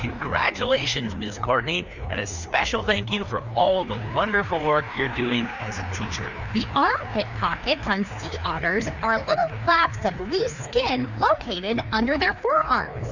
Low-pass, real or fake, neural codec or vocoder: 7.2 kHz; fake; codec, 16 kHz, 2 kbps, FreqCodec, larger model